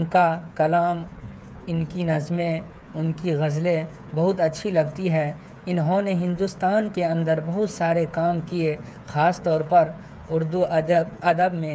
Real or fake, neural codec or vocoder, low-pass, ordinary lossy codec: fake; codec, 16 kHz, 8 kbps, FreqCodec, smaller model; none; none